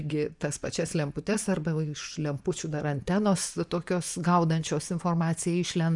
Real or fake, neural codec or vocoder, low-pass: fake; vocoder, 24 kHz, 100 mel bands, Vocos; 10.8 kHz